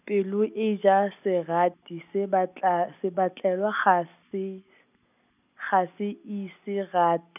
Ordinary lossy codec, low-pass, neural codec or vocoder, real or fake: none; 3.6 kHz; none; real